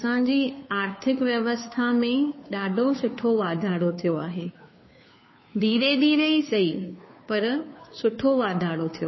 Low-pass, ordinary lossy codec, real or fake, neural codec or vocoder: 7.2 kHz; MP3, 24 kbps; fake; codec, 16 kHz, 2 kbps, FunCodec, trained on Chinese and English, 25 frames a second